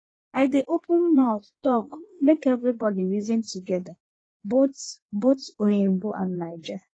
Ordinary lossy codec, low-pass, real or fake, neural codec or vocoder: AAC, 32 kbps; 9.9 kHz; fake; codec, 16 kHz in and 24 kHz out, 1.1 kbps, FireRedTTS-2 codec